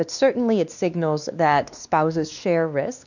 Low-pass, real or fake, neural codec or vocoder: 7.2 kHz; fake; codec, 16 kHz, 2 kbps, X-Codec, WavLM features, trained on Multilingual LibriSpeech